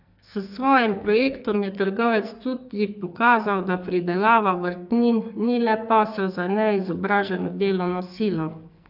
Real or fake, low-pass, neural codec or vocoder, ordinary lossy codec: fake; 5.4 kHz; codec, 44.1 kHz, 2.6 kbps, SNAC; AAC, 48 kbps